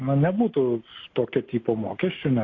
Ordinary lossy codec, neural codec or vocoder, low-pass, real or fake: AAC, 32 kbps; none; 7.2 kHz; real